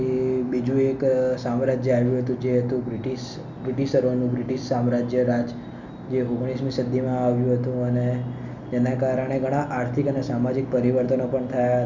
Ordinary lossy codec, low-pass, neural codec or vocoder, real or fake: none; 7.2 kHz; none; real